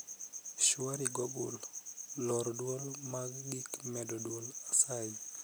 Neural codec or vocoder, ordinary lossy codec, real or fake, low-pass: none; none; real; none